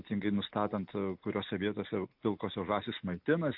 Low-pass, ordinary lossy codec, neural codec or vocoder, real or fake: 5.4 kHz; AAC, 48 kbps; none; real